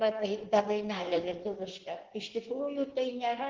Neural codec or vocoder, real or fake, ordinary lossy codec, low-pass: codec, 16 kHz in and 24 kHz out, 1.1 kbps, FireRedTTS-2 codec; fake; Opus, 16 kbps; 7.2 kHz